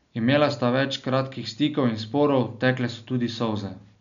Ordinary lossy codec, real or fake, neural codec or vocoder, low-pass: none; real; none; 7.2 kHz